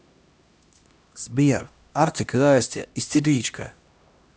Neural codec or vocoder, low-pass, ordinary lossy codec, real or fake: codec, 16 kHz, 1 kbps, X-Codec, HuBERT features, trained on LibriSpeech; none; none; fake